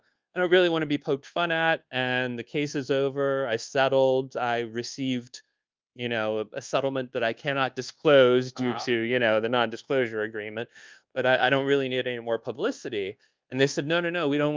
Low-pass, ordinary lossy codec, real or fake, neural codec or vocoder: 7.2 kHz; Opus, 32 kbps; fake; codec, 24 kHz, 1.2 kbps, DualCodec